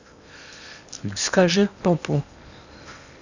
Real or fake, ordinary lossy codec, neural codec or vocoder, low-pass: fake; none; codec, 16 kHz in and 24 kHz out, 0.8 kbps, FocalCodec, streaming, 65536 codes; 7.2 kHz